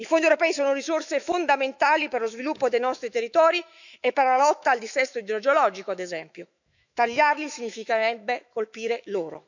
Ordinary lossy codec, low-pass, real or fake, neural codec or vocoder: none; 7.2 kHz; fake; autoencoder, 48 kHz, 128 numbers a frame, DAC-VAE, trained on Japanese speech